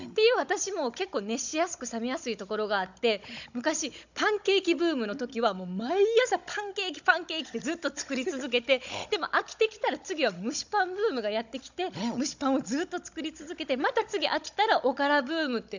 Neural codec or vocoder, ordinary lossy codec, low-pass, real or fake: codec, 16 kHz, 16 kbps, FunCodec, trained on Chinese and English, 50 frames a second; none; 7.2 kHz; fake